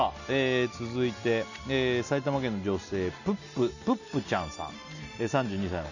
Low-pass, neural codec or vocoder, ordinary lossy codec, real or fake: 7.2 kHz; none; none; real